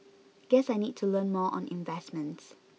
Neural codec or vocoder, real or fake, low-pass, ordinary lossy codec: none; real; none; none